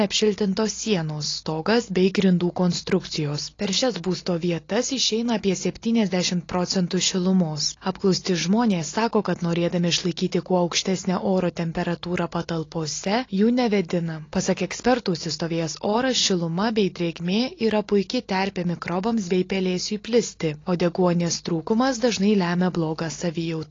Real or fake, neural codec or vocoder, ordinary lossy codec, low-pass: real; none; AAC, 32 kbps; 7.2 kHz